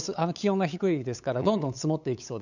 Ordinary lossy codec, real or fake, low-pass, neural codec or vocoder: none; fake; 7.2 kHz; codec, 16 kHz, 8 kbps, FunCodec, trained on LibriTTS, 25 frames a second